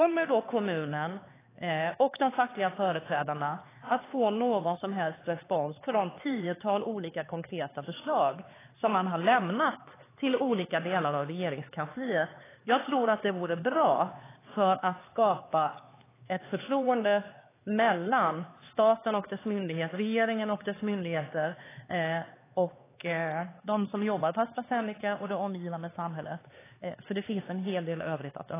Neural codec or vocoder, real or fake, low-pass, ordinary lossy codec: codec, 16 kHz, 4 kbps, X-Codec, HuBERT features, trained on LibriSpeech; fake; 3.6 kHz; AAC, 16 kbps